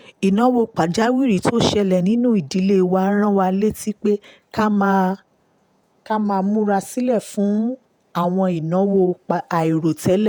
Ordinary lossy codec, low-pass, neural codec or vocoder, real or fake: none; none; vocoder, 48 kHz, 128 mel bands, Vocos; fake